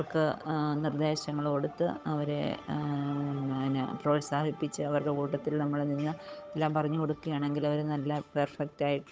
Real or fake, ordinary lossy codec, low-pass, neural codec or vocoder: fake; none; none; codec, 16 kHz, 8 kbps, FunCodec, trained on Chinese and English, 25 frames a second